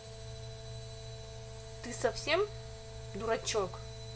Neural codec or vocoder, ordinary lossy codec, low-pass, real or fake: none; none; none; real